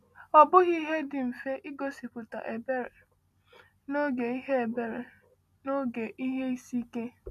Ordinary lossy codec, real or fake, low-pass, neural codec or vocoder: none; real; 14.4 kHz; none